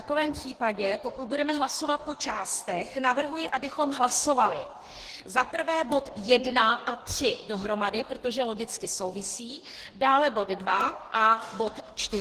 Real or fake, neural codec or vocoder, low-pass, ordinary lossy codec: fake; codec, 44.1 kHz, 2.6 kbps, DAC; 14.4 kHz; Opus, 16 kbps